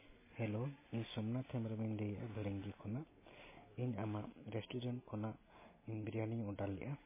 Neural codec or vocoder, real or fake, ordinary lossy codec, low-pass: none; real; MP3, 16 kbps; 3.6 kHz